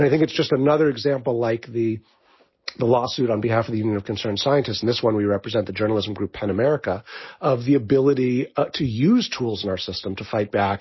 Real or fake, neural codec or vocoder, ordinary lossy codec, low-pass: real; none; MP3, 24 kbps; 7.2 kHz